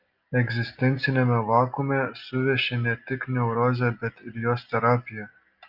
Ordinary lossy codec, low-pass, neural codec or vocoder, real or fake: Opus, 24 kbps; 5.4 kHz; none; real